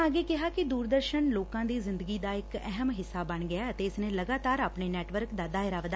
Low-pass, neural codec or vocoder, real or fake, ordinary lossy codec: none; none; real; none